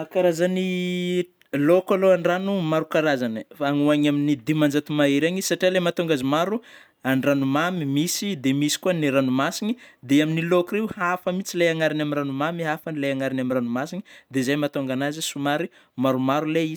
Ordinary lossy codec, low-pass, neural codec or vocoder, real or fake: none; none; none; real